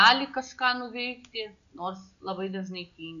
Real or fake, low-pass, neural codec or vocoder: real; 7.2 kHz; none